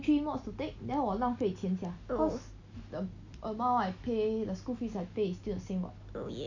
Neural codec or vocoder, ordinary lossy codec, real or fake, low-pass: none; none; real; 7.2 kHz